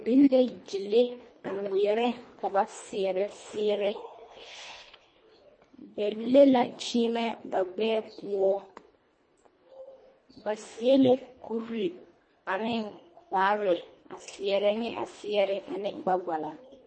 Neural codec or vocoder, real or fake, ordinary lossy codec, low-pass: codec, 24 kHz, 1.5 kbps, HILCodec; fake; MP3, 32 kbps; 9.9 kHz